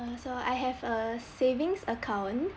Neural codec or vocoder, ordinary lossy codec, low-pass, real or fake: none; none; none; real